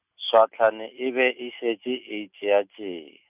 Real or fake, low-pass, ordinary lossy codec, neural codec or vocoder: real; 3.6 kHz; none; none